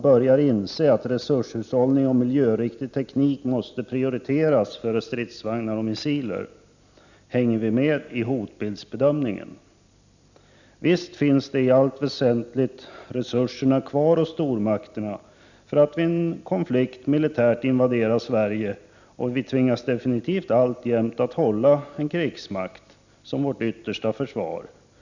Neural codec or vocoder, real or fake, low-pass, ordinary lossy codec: none; real; 7.2 kHz; none